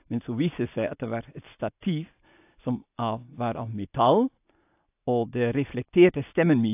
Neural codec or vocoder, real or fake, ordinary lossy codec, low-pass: none; real; AAC, 32 kbps; 3.6 kHz